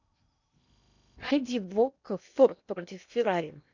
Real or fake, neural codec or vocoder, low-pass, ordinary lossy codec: fake; codec, 16 kHz in and 24 kHz out, 0.8 kbps, FocalCodec, streaming, 65536 codes; 7.2 kHz; none